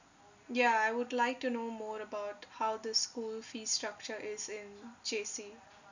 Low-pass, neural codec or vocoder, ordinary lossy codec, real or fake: 7.2 kHz; none; none; real